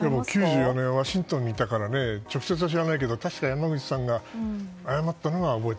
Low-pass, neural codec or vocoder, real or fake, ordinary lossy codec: none; none; real; none